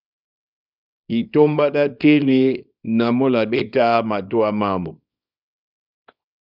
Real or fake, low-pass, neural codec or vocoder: fake; 5.4 kHz; codec, 24 kHz, 0.9 kbps, WavTokenizer, small release